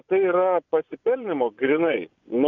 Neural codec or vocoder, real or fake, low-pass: vocoder, 24 kHz, 100 mel bands, Vocos; fake; 7.2 kHz